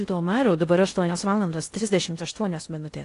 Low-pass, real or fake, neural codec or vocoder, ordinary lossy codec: 10.8 kHz; fake; codec, 16 kHz in and 24 kHz out, 0.6 kbps, FocalCodec, streaming, 4096 codes; AAC, 48 kbps